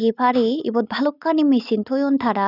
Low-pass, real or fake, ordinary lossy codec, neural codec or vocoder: 5.4 kHz; real; none; none